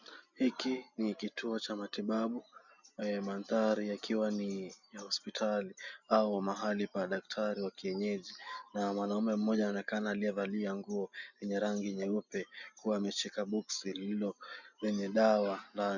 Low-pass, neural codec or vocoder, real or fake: 7.2 kHz; none; real